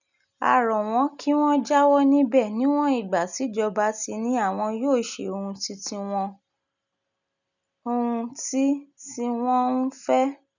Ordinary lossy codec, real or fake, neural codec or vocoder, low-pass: none; real; none; 7.2 kHz